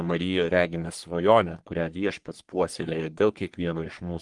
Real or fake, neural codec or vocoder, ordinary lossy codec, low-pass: fake; codec, 44.1 kHz, 3.4 kbps, Pupu-Codec; Opus, 24 kbps; 10.8 kHz